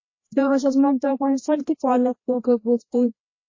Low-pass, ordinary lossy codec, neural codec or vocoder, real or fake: 7.2 kHz; MP3, 32 kbps; codec, 16 kHz, 1 kbps, FreqCodec, larger model; fake